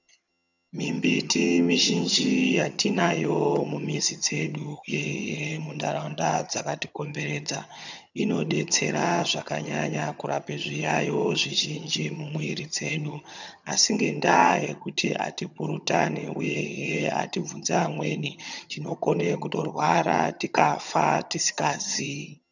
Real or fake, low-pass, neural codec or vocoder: fake; 7.2 kHz; vocoder, 22.05 kHz, 80 mel bands, HiFi-GAN